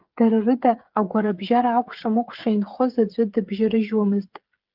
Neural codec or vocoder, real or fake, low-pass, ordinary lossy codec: codec, 16 kHz, 8 kbps, FreqCodec, smaller model; fake; 5.4 kHz; Opus, 32 kbps